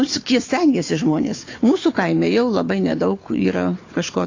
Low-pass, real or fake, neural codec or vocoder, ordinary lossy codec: 7.2 kHz; fake; codec, 16 kHz, 4 kbps, FunCodec, trained on Chinese and English, 50 frames a second; AAC, 32 kbps